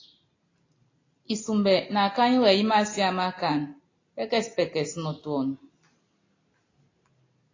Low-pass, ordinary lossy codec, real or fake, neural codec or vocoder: 7.2 kHz; AAC, 32 kbps; real; none